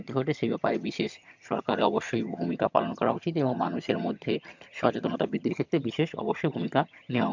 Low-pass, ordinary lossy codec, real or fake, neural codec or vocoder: 7.2 kHz; MP3, 64 kbps; fake; vocoder, 22.05 kHz, 80 mel bands, HiFi-GAN